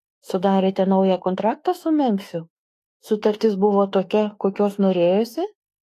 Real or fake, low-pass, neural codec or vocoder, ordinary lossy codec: fake; 14.4 kHz; autoencoder, 48 kHz, 32 numbers a frame, DAC-VAE, trained on Japanese speech; AAC, 48 kbps